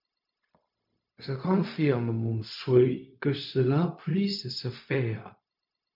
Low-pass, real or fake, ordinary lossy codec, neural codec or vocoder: 5.4 kHz; fake; MP3, 48 kbps; codec, 16 kHz, 0.4 kbps, LongCat-Audio-Codec